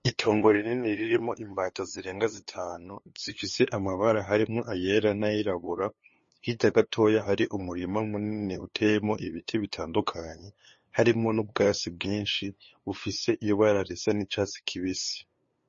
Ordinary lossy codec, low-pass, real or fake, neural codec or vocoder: MP3, 32 kbps; 7.2 kHz; fake; codec, 16 kHz, 2 kbps, FunCodec, trained on LibriTTS, 25 frames a second